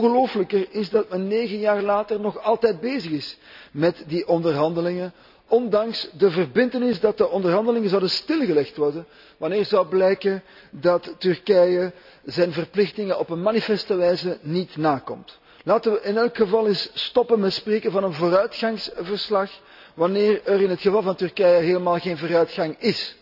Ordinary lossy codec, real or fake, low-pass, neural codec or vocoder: none; real; 5.4 kHz; none